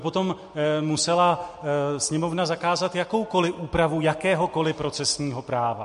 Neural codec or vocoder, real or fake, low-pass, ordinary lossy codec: none; real; 14.4 kHz; MP3, 48 kbps